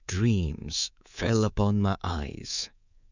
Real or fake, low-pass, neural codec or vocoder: fake; 7.2 kHz; codec, 24 kHz, 3.1 kbps, DualCodec